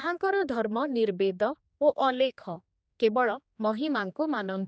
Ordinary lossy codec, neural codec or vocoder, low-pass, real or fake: none; codec, 16 kHz, 2 kbps, X-Codec, HuBERT features, trained on general audio; none; fake